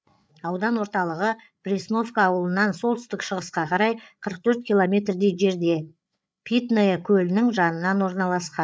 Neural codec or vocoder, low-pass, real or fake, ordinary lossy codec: codec, 16 kHz, 8 kbps, FreqCodec, larger model; none; fake; none